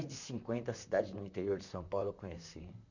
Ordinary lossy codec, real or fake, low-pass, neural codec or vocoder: none; fake; 7.2 kHz; vocoder, 44.1 kHz, 128 mel bands, Pupu-Vocoder